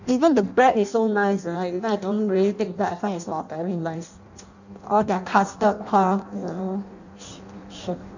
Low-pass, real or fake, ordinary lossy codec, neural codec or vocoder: 7.2 kHz; fake; none; codec, 16 kHz in and 24 kHz out, 0.6 kbps, FireRedTTS-2 codec